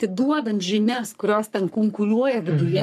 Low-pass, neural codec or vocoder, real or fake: 14.4 kHz; codec, 44.1 kHz, 3.4 kbps, Pupu-Codec; fake